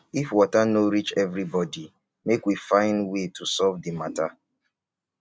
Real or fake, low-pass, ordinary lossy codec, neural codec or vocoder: real; none; none; none